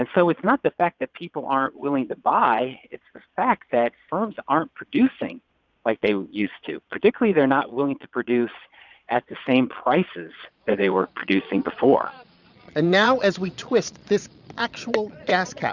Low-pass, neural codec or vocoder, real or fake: 7.2 kHz; vocoder, 22.05 kHz, 80 mel bands, Vocos; fake